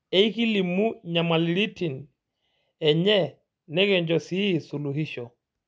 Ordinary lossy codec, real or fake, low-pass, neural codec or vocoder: none; real; none; none